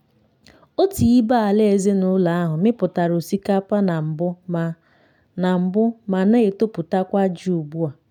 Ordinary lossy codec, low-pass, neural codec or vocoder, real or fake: none; 19.8 kHz; none; real